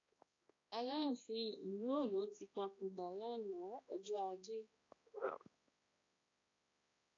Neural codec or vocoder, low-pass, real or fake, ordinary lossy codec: codec, 16 kHz, 1 kbps, X-Codec, HuBERT features, trained on balanced general audio; 7.2 kHz; fake; AAC, 32 kbps